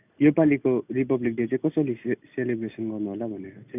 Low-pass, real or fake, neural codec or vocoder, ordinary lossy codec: 3.6 kHz; real; none; none